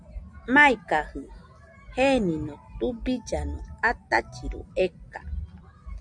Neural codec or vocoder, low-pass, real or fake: none; 9.9 kHz; real